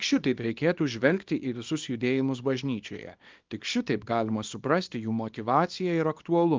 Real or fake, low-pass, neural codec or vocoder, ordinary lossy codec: fake; 7.2 kHz; codec, 24 kHz, 0.9 kbps, WavTokenizer, small release; Opus, 32 kbps